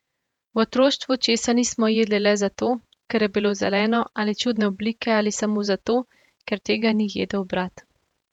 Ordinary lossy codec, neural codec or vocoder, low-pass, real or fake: none; vocoder, 48 kHz, 128 mel bands, Vocos; 19.8 kHz; fake